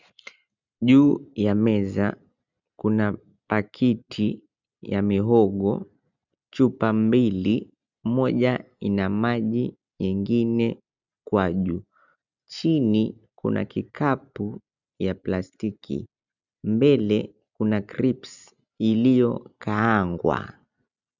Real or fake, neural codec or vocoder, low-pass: real; none; 7.2 kHz